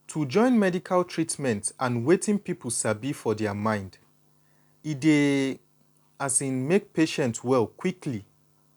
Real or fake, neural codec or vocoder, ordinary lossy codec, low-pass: real; none; none; 19.8 kHz